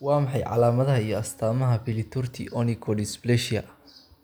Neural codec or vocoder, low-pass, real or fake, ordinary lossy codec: none; none; real; none